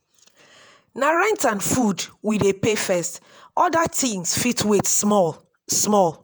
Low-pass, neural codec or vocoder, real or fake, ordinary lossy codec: none; vocoder, 48 kHz, 128 mel bands, Vocos; fake; none